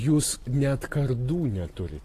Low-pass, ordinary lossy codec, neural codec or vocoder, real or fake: 14.4 kHz; AAC, 48 kbps; none; real